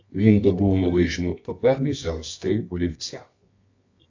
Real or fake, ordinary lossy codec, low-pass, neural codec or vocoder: fake; AAC, 48 kbps; 7.2 kHz; codec, 24 kHz, 0.9 kbps, WavTokenizer, medium music audio release